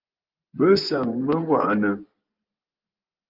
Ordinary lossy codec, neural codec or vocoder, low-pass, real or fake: Opus, 32 kbps; vocoder, 24 kHz, 100 mel bands, Vocos; 5.4 kHz; fake